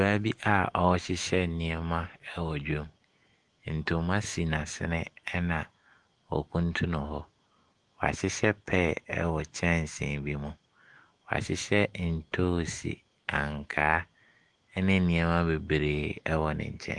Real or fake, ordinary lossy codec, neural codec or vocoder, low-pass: fake; Opus, 24 kbps; autoencoder, 48 kHz, 128 numbers a frame, DAC-VAE, trained on Japanese speech; 10.8 kHz